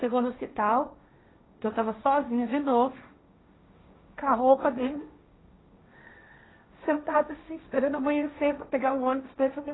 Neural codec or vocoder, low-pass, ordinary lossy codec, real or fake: codec, 16 kHz, 1.1 kbps, Voila-Tokenizer; 7.2 kHz; AAC, 16 kbps; fake